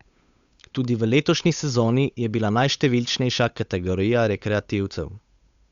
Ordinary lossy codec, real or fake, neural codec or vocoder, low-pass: Opus, 64 kbps; fake; codec, 16 kHz, 8 kbps, FunCodec, trained on Chinese and English, 25 frames a second; 7.2 kHz